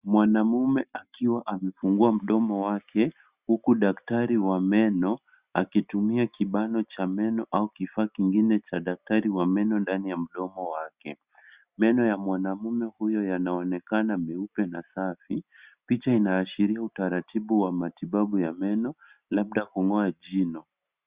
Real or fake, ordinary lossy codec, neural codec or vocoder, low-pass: real; Opus, 64 kbps; none; 3.6 kHz